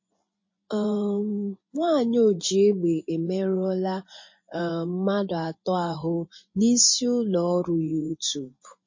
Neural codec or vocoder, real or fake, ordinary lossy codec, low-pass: vocoder, 24 kHz, 100 mel bands, Vocos; fake; MP3, 32 kbps; 7.2 kHz